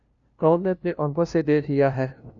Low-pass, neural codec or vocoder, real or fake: 7.2 kHz; codec, 16 kHz, 0.5 kbps, FunCodec, trained on LibriTTS, 25 frames a second; fake